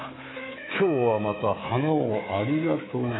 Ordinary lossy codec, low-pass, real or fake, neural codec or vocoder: AAC, 16 kbps; 7.2 kHz; fake; codec, 24 kHz, 3.1 kbps, DualCodec